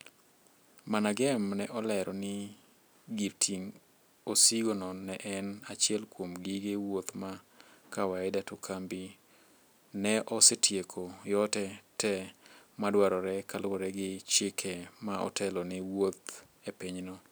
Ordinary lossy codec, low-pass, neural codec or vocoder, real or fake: none; none; none; real